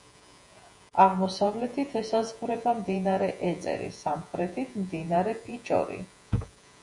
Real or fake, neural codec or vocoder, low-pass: fake; vocoder, 48 kHz, 128 mel bands, Vocos; 10.8 kHz